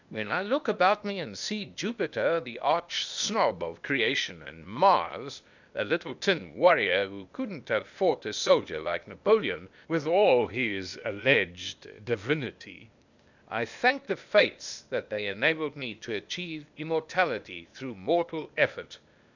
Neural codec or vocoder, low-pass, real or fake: codec, 16 kHz, 0.8 kbps, ZipCodec; 7.2 kHz; fake